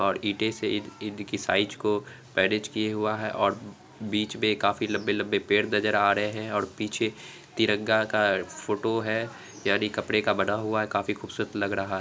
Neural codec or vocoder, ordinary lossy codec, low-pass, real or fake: none; none; none; real